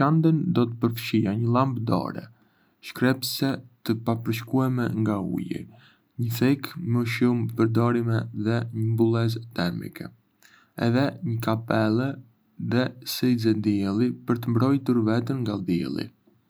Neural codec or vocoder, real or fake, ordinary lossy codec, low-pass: none; real; none; none